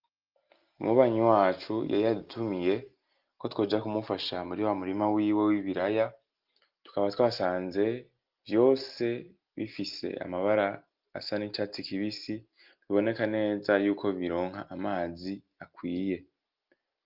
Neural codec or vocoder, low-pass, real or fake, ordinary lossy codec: none; 5.4 kHz; real; Opus, 32 kbps